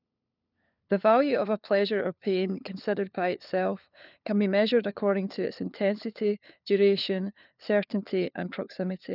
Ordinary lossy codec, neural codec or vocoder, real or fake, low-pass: none; codec, 16 kHz, 4 kbps, FunCodec, trained on LibriTTS, 50 frames a second; fake; 5.4 kHz